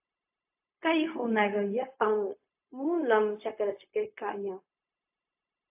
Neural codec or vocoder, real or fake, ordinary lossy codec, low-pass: codec, 16 kHz, 0.4 kbps, LongCat-Audio-Codec; fake; AAC, 32 kbps; 3.6 kHz